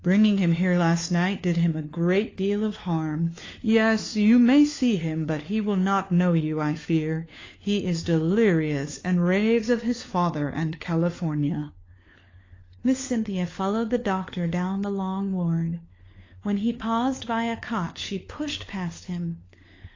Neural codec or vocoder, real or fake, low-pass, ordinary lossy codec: codec, 16 kHz, 4 kbps, FunCodec, trained on LibriTTS, 50 frames a second; fake; 7.2 kHz; AAC, 32 kbps